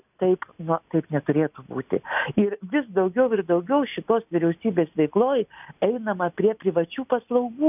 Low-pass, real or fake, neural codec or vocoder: 3.6 kHz; real; none